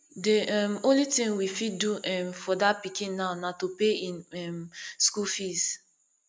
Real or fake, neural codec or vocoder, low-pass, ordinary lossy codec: real; none; none; none